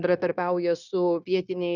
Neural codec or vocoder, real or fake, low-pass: codec, 16 kHz, 0.9 kbps, LongCat-Audio-Codec; fake; 7.2 kHz